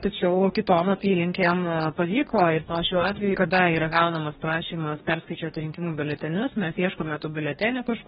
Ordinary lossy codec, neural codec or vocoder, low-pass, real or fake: AAC, 16 kbps; codec, 44.1 kHz, 2.6 kbps, DAC; 19.8 kHz; fake